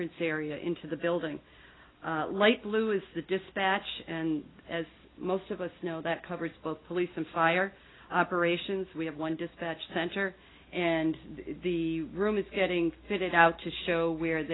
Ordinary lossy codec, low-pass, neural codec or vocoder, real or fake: AAC, 16 kbps; 7.2 kHz; none; real